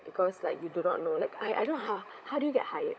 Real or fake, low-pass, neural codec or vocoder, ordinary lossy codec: fake; none; codec, 16 kHz, 16 kbps, FunCodec, trained on LibriTTS, 50 frames a second; none